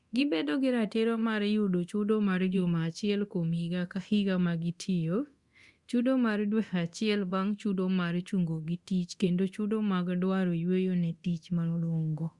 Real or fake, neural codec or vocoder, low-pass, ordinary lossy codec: fake; codec, 24 kHz, 0.9 kbps, DualCodec; 10.8 kHz; Opus, 64 kbps